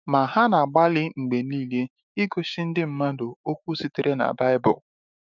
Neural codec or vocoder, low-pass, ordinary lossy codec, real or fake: autoencoder, 48 kHz, 128 numbers a frame, DAC-VAE, trained on Japanese speech; 7.2 kHz; none; fake